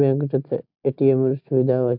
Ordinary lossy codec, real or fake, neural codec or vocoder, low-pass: MP3, 48 kbps; real; none; 5.4 kHz